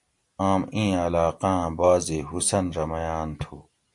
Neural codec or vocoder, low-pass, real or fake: none; 10.8 kHz; real